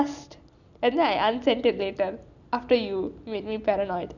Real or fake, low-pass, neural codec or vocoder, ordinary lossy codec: real; 7.2 kHz; none; none